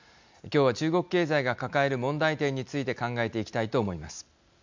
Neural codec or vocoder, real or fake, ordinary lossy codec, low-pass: none; real; MP3, 64 kbps; 7.2 kHz